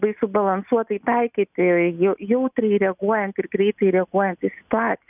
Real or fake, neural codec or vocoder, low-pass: real; none; 3.6 kHz